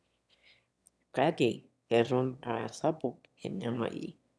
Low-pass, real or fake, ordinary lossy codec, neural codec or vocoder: none; fake; none; autoencoder, 22.05 kHz, a latent of 192 numbers a frame, VITS, trained on one speaker